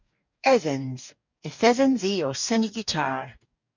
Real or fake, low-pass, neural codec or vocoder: fake; 7.2 kHz; codec, 44.1 kHz, 2.6 kbps, DAC